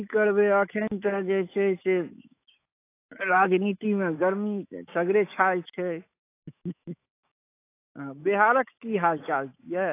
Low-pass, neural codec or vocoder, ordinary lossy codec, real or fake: 3.6 kHz; autoencoder, 48 kHz, 128 numbers a frame, DAC-VAE, trained on Japanese speech; AAC, 24 kbps; fake